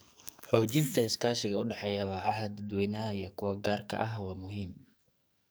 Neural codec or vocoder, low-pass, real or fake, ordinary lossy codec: codec, 44.1 kHz, 2.6 kbps, SNAC; none; fake; none